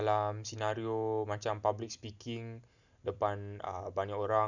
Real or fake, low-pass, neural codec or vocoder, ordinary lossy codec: real; 7.2 kHz; none; none